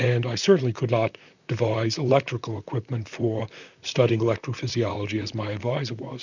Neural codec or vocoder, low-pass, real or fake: vocoder, 44.1 kHz, 128 mel bands, Pupu-Vocoder; 7.2 kHz; fake